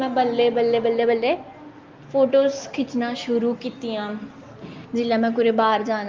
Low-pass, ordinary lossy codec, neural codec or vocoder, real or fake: 7.2 kHz; Opus, 32 kbps; none; real